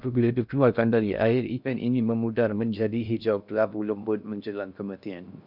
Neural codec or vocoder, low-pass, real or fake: codec, 16 kHz in and 24 kHz out, 0.6 kbps, FocalCodec, streaming, 2048 codes; 5.4 kHz; fake